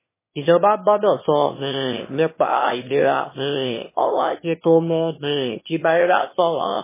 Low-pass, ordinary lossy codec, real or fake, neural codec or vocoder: 3.6 kHz; MP3, 16 kbps; fake; autoencoder, 22.05 kHz, a latent of 192 numbers a frame, VITS, trained on one speaker